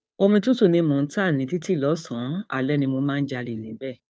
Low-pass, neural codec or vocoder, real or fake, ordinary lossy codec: none; codec, 16 kHz, 2 kbps, FunCodec, trained on Chinese and English, 25 frames a second; fake; none